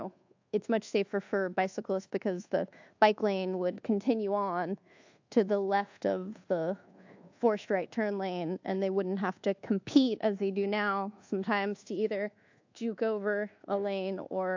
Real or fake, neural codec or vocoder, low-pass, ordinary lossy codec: fake; codec, 24 kHz, 1.2 kbps, DualCodec; 7.2 kHz; AAC, 48 kbps